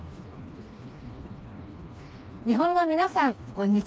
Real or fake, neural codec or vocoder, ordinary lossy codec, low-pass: fake; codec, 16 kHz, 2 kbps, FreqCodec, smaller model; none; none